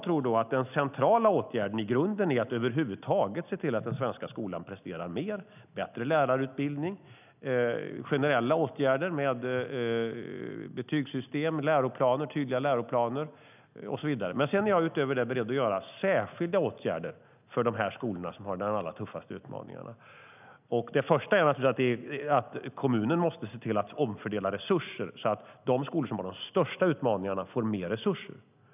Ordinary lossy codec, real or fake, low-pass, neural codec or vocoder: none; real; 3.6 kHz; none